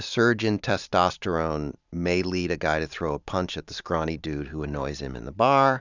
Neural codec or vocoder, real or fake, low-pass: vocoder, 44.1 kHz, 128 mel bands every 512 samples, BigVGAN v2; fake; 7.2 kHz